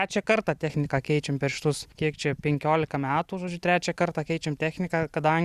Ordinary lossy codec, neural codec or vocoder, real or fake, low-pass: Opus, 64 kbps; none; real; 14.4 kHz